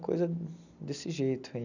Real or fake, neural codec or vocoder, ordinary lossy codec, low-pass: real; none; none; 7.2 kHz